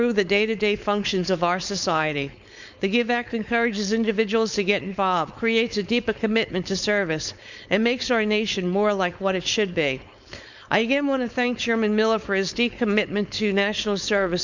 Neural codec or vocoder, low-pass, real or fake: codec, 16 kHz, 4.8 kbps, FACodec; 7.2 kHz; fake